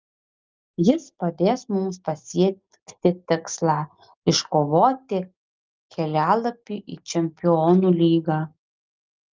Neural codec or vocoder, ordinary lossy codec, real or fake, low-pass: none; Opus, 24 kbps; real; 7.2 kHz